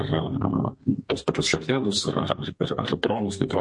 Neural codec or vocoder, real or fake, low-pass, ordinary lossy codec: codec, 32 kHz, 1.9 kbps, SNAC; fake; 10.8 kHz; MP3, 48 kbps